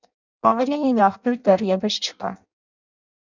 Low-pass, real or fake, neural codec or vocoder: 7.2 kHz; fake; codec, 16 kHz in and 24 kHz out, 0.6 kbps, FireRedTTS-2 codec